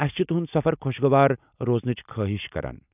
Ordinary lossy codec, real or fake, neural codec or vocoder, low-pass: none; real; none; 3.6 kHz